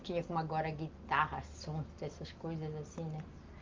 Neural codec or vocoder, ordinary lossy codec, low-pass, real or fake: none; Opus, 32 kbps; 7.2 kHz; real